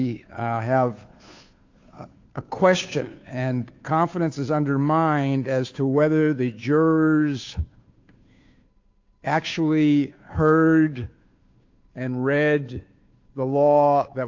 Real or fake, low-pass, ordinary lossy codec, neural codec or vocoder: fake; 7.2 kHz; AAC, 48 kbps; codec, 16 kHz, 2 kbps, FunCodec, trained on Chinese and English, 25 frames a second